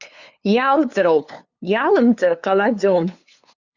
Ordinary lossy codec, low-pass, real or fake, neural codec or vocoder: Opus, 64 kbps; 7.2 kHz; fake; codec, 16 kHz, 2 kbps, FunCodec, trained on LibriTTS, 25 frames a second